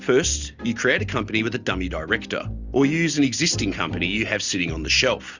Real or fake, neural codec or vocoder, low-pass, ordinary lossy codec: real; none; 7.2 kHz; Opus, 64 kbps